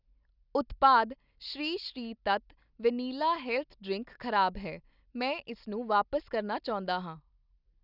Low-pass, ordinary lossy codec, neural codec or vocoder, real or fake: 5.4 kHz; none; none; real